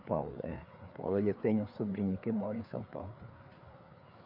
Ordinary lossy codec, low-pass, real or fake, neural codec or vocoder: none; 5.4 kHz; fake; codec, 16 kHz, 4 kbps, FreqCodec, larger model